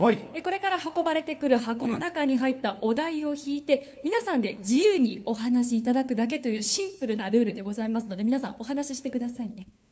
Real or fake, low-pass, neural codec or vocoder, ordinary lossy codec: fake; none; codec, 16 kHz, 2 kbps, FunCodec, trained on LibriTTS, 25 frames a second; none